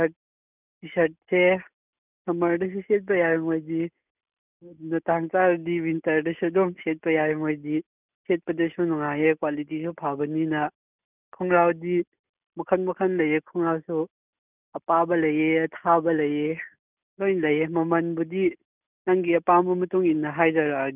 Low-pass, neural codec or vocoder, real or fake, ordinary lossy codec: 3.6 kHz; none; real; none